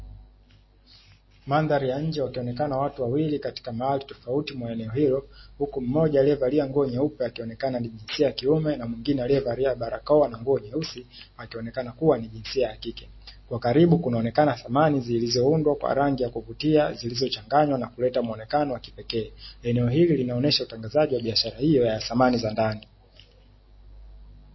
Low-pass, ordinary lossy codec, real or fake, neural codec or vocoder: 7.2 kHz; MP3, 24 kbps; real; none